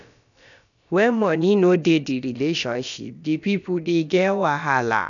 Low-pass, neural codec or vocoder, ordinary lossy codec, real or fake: 7.2 kHz; codec, 16 kHz, about 1 kbps, DyCAST, with the encoder's durations; none; fake